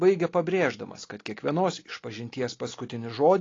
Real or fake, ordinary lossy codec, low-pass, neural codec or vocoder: real; AAC, 32 kbps; 7.2 kHz; none